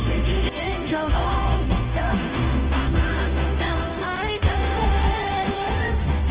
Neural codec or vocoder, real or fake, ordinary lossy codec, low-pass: codec, 16 kHz, 0.5 kbps, FunCodec, trained on Chinese and English, 25 frames a second; fake; Opus, 16 kbps; 3.6 kHz